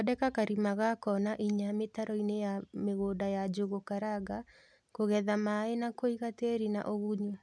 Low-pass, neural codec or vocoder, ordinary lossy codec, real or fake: none; none; none; real